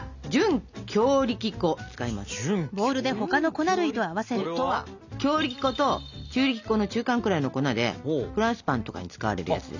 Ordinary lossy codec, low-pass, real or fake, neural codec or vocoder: none; 7.2 kHz; real; none